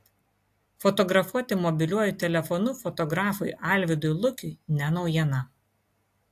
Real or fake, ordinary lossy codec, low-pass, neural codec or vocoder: real; AAC, 64 kbps; 14.4 kHz; none